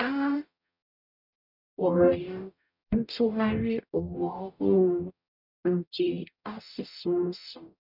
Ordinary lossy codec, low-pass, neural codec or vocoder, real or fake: none; 5.4 kHz; codec, 44.1 kHz, 0.9 kbps, DAC; fake